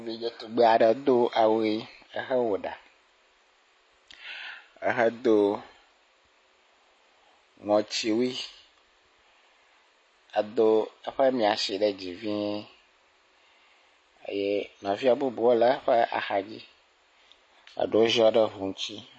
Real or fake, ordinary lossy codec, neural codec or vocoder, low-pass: real; MP3, 32 kbps; none; 9.9 kHz